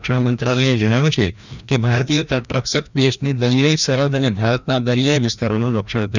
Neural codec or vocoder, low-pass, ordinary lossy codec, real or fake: codec, 16 kHz, 1 kbps, FreqCodec, larger model; 7.2 kHz; none; fake